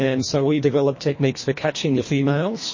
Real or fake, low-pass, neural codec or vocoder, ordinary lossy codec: fake; 7.2 kHz; codec, 24 kHz, 1.5 kbps, HILCodec; MP3, 32 kbps